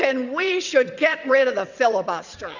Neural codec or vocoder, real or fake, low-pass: vocoder, 22.05 kHz, 80 mel bands, WaveNeXt; fake; 7.2 kHz